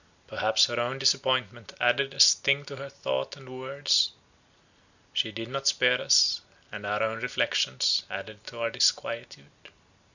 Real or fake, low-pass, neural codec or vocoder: real; 7.2 kHz; none